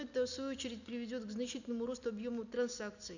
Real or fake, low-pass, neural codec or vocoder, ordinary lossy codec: real; 7.2 kHz; none; none